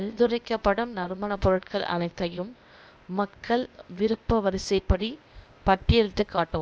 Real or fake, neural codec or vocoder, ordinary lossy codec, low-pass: fake; codec, 16 kHz, 0.8 kbps, ZipCodec; none; none